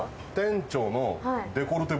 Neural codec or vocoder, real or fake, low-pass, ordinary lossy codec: none; real; none; none